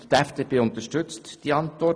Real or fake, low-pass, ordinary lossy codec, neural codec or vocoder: real; none; none; none